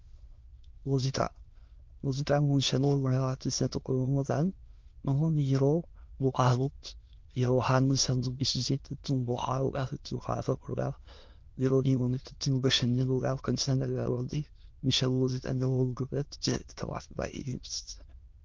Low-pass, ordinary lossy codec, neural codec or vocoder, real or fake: 7.2 kHz; Opus, 24 kbps; autoencoder, 22.05 kHz, a latent of 192 numbers a frame, VITS, trained on many speakers; fake